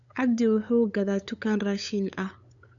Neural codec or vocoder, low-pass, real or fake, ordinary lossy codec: codec, 16 kHz, 8 kbps, FunCodec, trained on LibriTTS, 25 frames a second; 7.2 kHz; fake; none